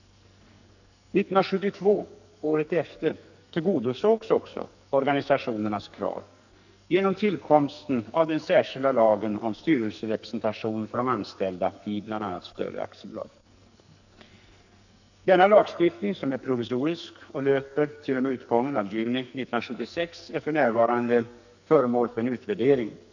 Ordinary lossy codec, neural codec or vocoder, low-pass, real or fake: none; codec, 44.1 kHz, 2.6 kbps, SNAC; 7.2 kHz; fake